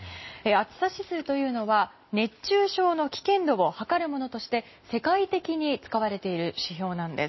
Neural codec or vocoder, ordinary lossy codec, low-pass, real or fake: none; MP3, 24 kbps; 7.2 kHz; real